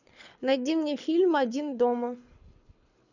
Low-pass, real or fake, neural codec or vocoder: 7.2 kHz; fake; codec, 24 kHz, 6 kbps, HILCodec